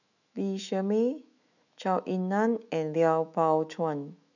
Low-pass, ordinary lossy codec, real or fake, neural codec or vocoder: 7.2 kHz; none; fake; autoencoder, 48 kHz, 128 numbers a frame, DAC-VAE, trained on Japanese speech